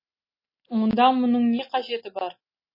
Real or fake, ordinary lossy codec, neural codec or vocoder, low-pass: real; MP3, 24 kbps; none; 5.4 kHz